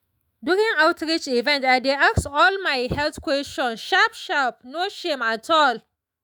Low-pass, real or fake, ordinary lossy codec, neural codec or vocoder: none; fake; none; autoencoder, 48 kHz, 128 numbers a frame, DAC-VAE, trained on Japanese speech